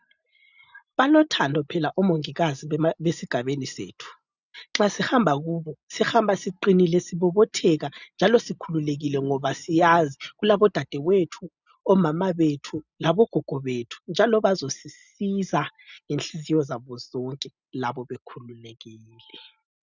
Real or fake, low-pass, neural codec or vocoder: real; 7.2 kHz; none